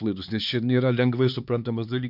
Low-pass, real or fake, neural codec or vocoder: 5.4 kHz; fake; codec, 16 kHz, 4 kbps, X-Codec, HuBERT features, trained on LibriSpeech